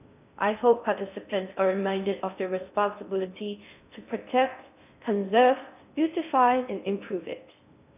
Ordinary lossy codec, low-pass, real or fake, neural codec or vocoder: none; 3.6 kHz; fake; codec, 16 kHz in and 24 kHz out, 0.6 kbps, FocalCodec, streaming, 4096 codes